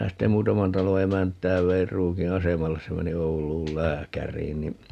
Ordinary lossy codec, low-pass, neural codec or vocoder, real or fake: none; 14.4 kHz; none; real